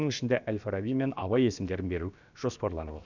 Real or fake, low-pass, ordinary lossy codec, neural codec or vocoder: fake; 7.2 kHz; none; codec, 16 kHz, about 1 kbps, DyCAST, with the encoder's durations